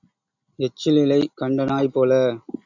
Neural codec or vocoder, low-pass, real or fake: none; 7.2 kHz; real